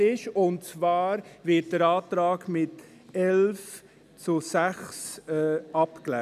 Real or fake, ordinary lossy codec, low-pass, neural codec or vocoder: real; none; 14.4 kHz; none